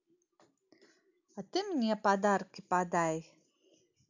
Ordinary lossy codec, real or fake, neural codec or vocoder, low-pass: none; real; none; 7.2 kHz